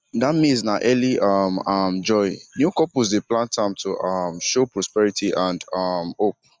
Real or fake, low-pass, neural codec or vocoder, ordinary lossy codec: real; none; none; none